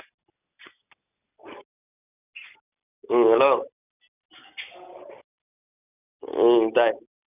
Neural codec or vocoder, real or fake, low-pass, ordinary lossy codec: none; real; 3.6 kHz; none